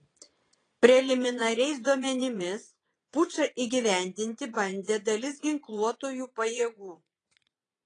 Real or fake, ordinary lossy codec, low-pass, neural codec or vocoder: fake; AAC, 32 kbps; 9.9 kHz; vocoder, 22.05 kHz, 80 mel bands, Vocos